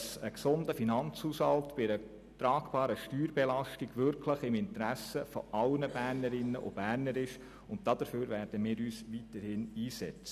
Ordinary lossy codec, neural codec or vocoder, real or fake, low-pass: none; none; real; 14.4 kHz